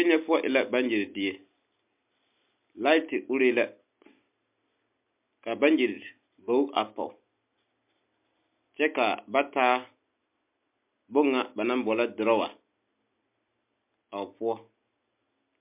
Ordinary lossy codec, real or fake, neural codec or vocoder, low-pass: MP3, 32 kbps; real; none; 3.6 kHz